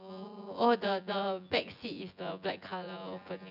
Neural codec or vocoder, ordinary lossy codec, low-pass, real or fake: vocoder, 24 kHz, 100 mel bands, Vocos; none; 5.4 kHz; fake